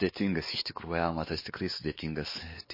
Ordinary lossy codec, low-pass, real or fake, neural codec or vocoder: MP3, 24 kbps; 5.4 kHz; fake; codec, 16 kHz, 2 kbps, X-Codec, WavLM features, trained on Multilingual LibriSpeech